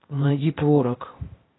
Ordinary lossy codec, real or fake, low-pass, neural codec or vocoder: AAC, 16 kbps; fake; 7.2 kHz; codec, 16 kHz, 0.8 kbps, ZipCodec